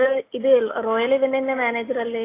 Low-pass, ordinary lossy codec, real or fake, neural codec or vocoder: 3.6 kHz; AAC, 16 kbps; real; none